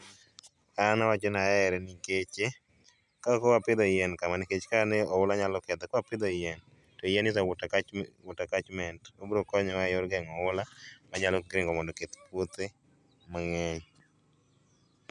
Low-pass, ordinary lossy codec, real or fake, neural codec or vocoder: 10.8 kHz; none; real; none